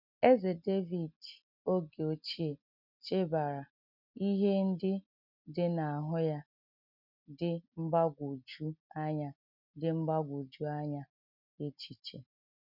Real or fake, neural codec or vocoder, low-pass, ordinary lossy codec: real; none; 5.4 kHz; none